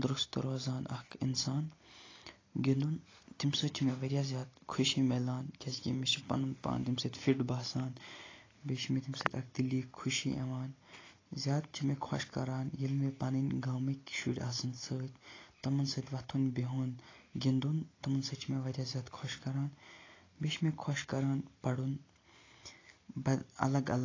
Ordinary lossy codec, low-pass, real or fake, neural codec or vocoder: AAC, 32 kbps; 7.2 kHz; real; none